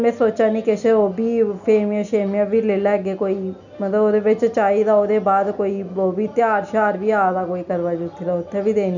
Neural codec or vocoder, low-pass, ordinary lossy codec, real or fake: none; 7.2 kHz; none; real